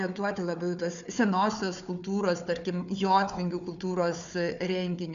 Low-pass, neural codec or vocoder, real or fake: 7.2 kHz; codec, 16 kHz, 4 kbps, FunCodec, trained on Chinese and English, 50 frames a second; fake